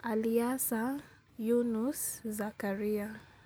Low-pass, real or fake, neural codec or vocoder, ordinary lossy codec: none; real; none; none